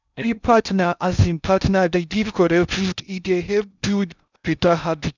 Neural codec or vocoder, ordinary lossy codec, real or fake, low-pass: codec, 16 kHz in and 24 kHz out, 0.6 kbps, FocalCodec, streaming, 2048 codes; none; fake; 7.2 kHz